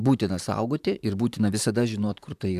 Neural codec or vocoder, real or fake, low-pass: vocoder, 44.1 kHz, 128 mel bands, Pupu-Vocoder; fake; 14.4 kHz